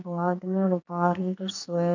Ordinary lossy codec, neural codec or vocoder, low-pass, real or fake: none; codec, 44.1 kHz, 2.6 kbps, SNAC; 7.2 kHz; fake